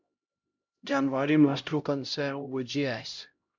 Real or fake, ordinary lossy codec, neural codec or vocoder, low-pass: fake; MP3, 64 kbps; codec, 16 kHz, 0.5 kbps, X-Codec, HuBERT features, trained on LibriSpeech; 7.2 kHz